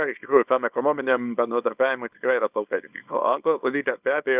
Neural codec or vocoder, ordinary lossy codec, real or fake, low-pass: codec, 24 kHz, 0.9 kbps, WavTokenizer, small release; Opus, 64 kbps; fake; 3.6 kHz